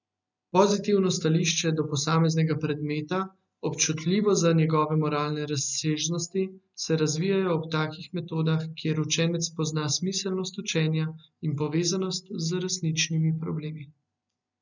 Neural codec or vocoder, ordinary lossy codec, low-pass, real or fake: none; none; 7.2 kHz; real